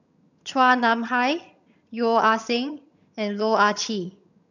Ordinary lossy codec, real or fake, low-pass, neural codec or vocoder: none; fake; 7.2 kHz; vocoder, 22.05 kHz, 80 mel bands, HiFi-GAN